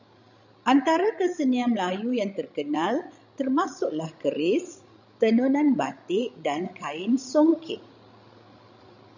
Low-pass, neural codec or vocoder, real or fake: 7.2 kHz; codec, 16 kHz, 16 kbps, FreqCodec, larger model; fake